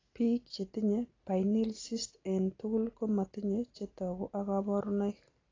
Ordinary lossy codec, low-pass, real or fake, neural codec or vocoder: AAC, 32 kbps; 7.2 kHz; real; none